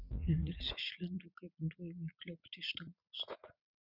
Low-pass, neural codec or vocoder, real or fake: 5.4 kHz; vocoder, 44.1 kHz, 80 mel bands, Vocos; fake